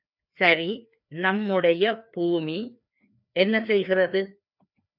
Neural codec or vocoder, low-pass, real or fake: codec, 16 kHz, 2 kbps, FreqCodec, larger model; 5.4 kHz; fake